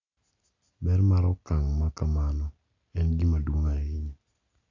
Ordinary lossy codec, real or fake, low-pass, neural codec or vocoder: AAC, 48 kbps; real; 7.2 kHz; none